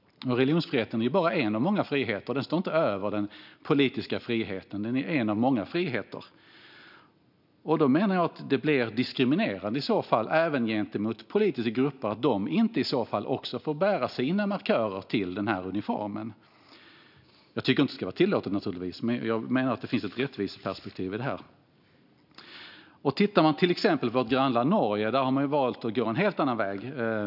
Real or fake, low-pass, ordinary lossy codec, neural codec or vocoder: real; 5.4 kHz; none; none